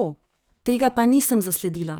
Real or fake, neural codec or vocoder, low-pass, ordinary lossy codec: fake; codec, 44.1 kHz, 2.6 kbps, SNAC; none; none